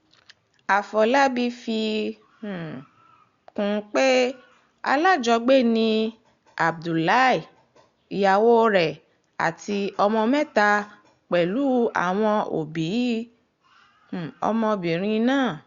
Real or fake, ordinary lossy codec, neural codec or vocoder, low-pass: real; Opus, 64 kbps; none; 7.2 kHz